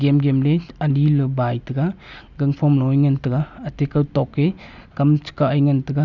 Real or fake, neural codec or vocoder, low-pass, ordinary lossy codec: real; none; 7.2 kHz; none